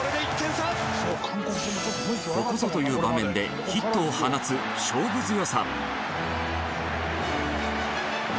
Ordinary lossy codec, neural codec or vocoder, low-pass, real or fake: none; none; none; real